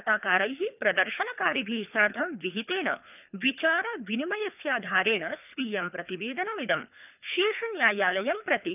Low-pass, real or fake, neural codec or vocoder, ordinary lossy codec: 3.6 kHz; fake; codec, 24 kHz, 3 kbps, HILCodec; none